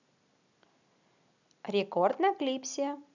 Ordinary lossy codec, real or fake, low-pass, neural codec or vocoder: none; real; 7.2 kHz; none